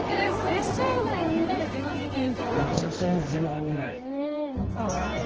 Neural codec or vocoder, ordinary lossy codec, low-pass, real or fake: codec, 24 kHz, 0.9 kbps, WavTokenizer, medium music audio release; Opus, 16 kbps; 7.2 kHz; fake